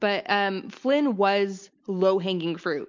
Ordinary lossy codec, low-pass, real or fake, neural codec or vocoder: MP3, 48 kbps; 7.2 kHz; real; none